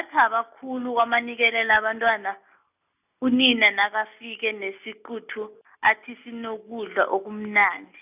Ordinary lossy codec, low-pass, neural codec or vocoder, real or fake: none; 3.6 kHz; none; real